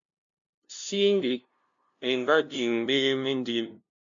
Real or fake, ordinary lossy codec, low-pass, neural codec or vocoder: fake; MP3, 64 kbps; 7.2 kHz; codec, 16 kHz, 0.5 kbps, FunCodec, trained on LibriTTS, 25 frames a second